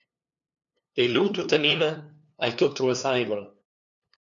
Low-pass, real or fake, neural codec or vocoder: 7.2 kHz; fake; codec, 16 kHz, 2 kbps, FunCodec, trained on LibriTTS, 25 frames a second